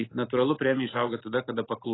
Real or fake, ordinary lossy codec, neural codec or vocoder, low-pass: real; AAC, 16 kbps; none; 7.2 kHz